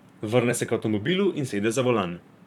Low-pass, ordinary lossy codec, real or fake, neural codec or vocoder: 19.8 kHz; none; fake; vocoder, 44.1 kHz, 128 mel bands, Pupu-Vocoder